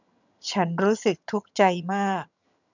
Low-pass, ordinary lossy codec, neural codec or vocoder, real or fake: 7.2 kHz; none; vocoder, 22.05 kHz, 80 mel bands, HiFi-GAN; fake